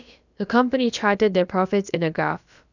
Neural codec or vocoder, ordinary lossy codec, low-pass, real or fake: codec, 16 kHz, about 1 kbps, DyCAST, with the encoder's durations; none; 7.2 kHz; fake